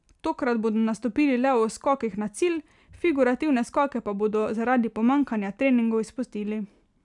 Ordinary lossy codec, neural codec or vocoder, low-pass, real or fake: none; none; 10.8 kHz; real